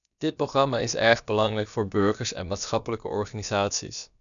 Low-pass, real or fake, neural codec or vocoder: 7.2 kHz; fake; codec, 16 kHz, about 1 kbps, DyCAST, with the encoder's durations